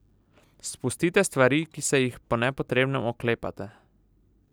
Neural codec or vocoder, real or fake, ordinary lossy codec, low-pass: none; real; none; none